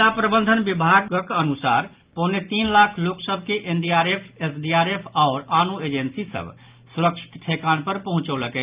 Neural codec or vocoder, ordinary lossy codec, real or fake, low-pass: none; Opus, 24 kbps; real; 3.6 kHz